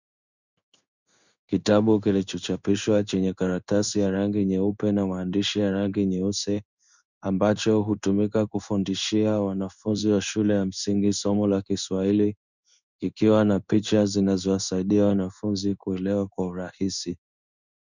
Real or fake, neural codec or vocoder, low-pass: fake; codec, 16 kHz in and 24 kHz out, 1 kbps, XY-Tokenizer; 7.2 kHz